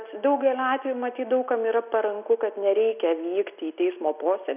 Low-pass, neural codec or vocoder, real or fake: 3.6 kHz; none; real